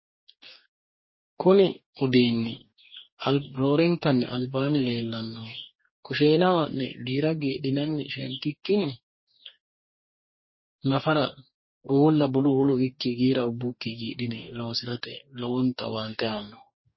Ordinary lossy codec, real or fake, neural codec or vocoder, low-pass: MP3, 24 kbps; fake; codec, 44.1 kHz, 2.6 kbps, DAC; 7.2 kHz